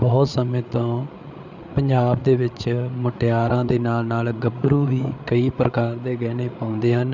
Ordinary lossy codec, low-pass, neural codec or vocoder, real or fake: none; 7.2 kHz; codec, 16 kHz, 8 kbps, FreqCodec, larger model; fake